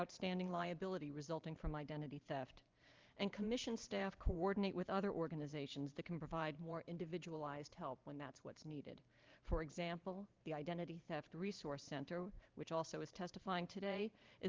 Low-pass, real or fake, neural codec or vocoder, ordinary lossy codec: 7.2 kHz; fake; vocoder, 44.1 kHz, 128 mel bands every 512 samples, BigVGAN v2; Opus, 32 kbps